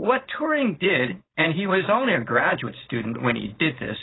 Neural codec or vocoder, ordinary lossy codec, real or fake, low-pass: codec, 16 kHz, 4.8 kbps, FACodec; AAC, 16 kbps; fake; 7.2 kHz